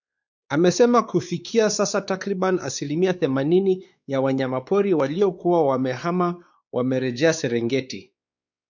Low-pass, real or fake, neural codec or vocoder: 7.2 kHz; fake; codec, 16 kHz, 4 kbps, X-Codec, WavLM features, trained on Multilingual LibriSpeech